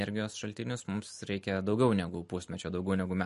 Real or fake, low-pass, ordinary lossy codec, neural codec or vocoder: real; 14.4 kHz; MP3, 48 kbps; none